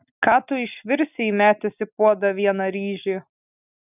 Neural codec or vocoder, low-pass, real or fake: none; 3.6 kHz; real